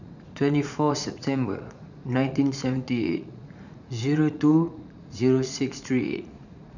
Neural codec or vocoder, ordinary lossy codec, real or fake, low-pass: vocoder, 44.1 kHz, 80 mel bands, Vocos; none; fake; 7.2 kHz